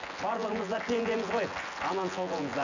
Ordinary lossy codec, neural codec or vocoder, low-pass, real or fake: none; vocoder, 24 kHz, 100 mel bands, Vocos; 7.2 kHz; fake